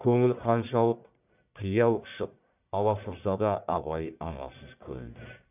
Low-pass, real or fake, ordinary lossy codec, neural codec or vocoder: 3.6 kHz; fake; none; codec, 44.1 kHz, 1.7 kbps, Pupu-Codec